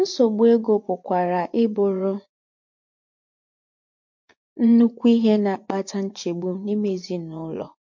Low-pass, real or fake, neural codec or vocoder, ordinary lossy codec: 7.2 kHz; fake; vocoder, 24 kHz, 100 mel bands, Vocos; MP3, 48 kbps